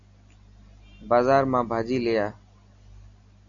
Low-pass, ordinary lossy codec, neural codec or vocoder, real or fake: 7.2 kHz; MP3, 64 kbps; none; real